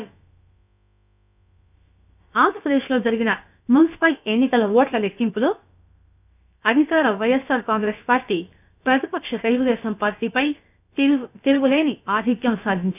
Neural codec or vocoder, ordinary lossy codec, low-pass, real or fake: codec, 16 kHz, about 1 kbps, DyCAST, with the encoder's durations; none; 3.6 kHz; fake